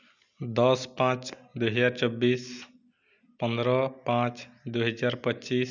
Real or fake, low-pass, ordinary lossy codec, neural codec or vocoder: real; 7.2 kHz; none; none